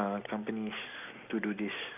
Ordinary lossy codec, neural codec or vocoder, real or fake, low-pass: none; none; real; 3.6 kHz